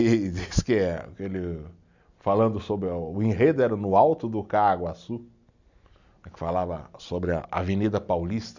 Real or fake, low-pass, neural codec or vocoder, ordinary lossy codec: real; 7.2 kHz; none; none